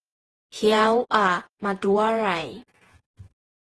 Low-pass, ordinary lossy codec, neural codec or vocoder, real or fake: 10.8 kHz; Opus, 16 kbps; vocoder, 48 kHz, 128 mel bands, Vocos; fake